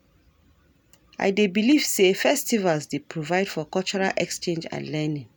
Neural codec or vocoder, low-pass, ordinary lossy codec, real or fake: none; none; none; real